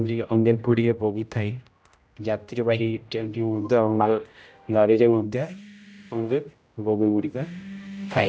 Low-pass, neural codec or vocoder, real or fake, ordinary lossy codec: none; codec, 16 kHz, 0.5 kbps, X-Codec, HuBERT features, trained on general audio; fake; none